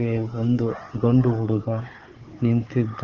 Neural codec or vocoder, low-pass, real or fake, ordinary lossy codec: codec, 16 kHz, 4 kbps, FunCodec, trained on Chinese and English, 50 frames a second; 7.2 kHz; fake; Opus, 16 kbps